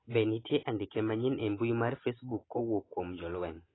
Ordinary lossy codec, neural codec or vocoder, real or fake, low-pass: AAC, 16 kbps; none; real; 7.2 kHz